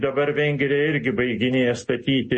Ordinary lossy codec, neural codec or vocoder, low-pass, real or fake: MP3, 32 kbps; none; 10.8 kHz; real